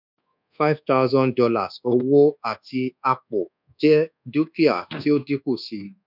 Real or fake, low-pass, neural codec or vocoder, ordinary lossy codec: fake; 5.4 kHz; codec, 24 kHz, 1.2 kbps, DualCodec; none